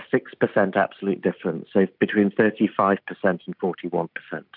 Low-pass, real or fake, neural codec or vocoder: 5.4 kHz; real; none